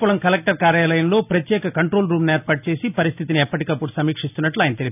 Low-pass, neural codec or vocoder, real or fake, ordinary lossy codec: 3.6 kHz; none; real; none